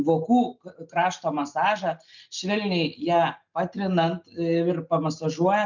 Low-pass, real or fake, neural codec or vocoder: 7.2 kHz; real; none